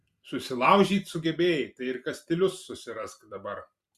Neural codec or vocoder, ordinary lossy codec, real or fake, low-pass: vocoder, 44.1 kHz, 128 mel bands every 256 samples, BigVGAN v2; Opus, 64 kbps; fake; 14.4 kHz